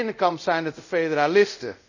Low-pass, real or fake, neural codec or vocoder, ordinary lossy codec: 7.2 kHz; fake; codec, 24 kHz, 0.5 kbps, DualCodec; none